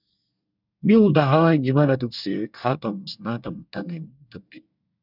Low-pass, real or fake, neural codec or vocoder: 5.4 kHz; fake; codec, 24 kHz, 1 kbps, SNAC